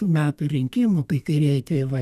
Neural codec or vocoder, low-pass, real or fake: codec, 32 kHz, 1.9 kbps, SNAC; 14.4 kHz; fake